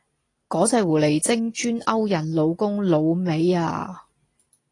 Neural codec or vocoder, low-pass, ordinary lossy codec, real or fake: none; 10.8 kHz; AAC, 32 kbps; real